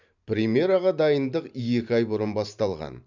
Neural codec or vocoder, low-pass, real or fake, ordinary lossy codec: vocoder, 44.1 kHz, 128 mel bands every 512 samples, BigVGAN v2; 7.2 kHz; fake; none